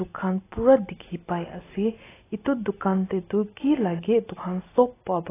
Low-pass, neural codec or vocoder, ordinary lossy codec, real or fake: 3.6 kHz; none; AAC, 16 kbps; real